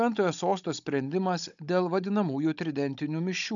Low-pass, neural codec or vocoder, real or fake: 7.2 kHz; codec, 16 kHz, 16 kbps, FunCodec, trained on Chinese and English, 50 frames a second; fake